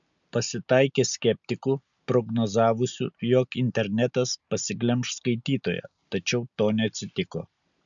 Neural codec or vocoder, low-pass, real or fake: none; 7.2 kHz; real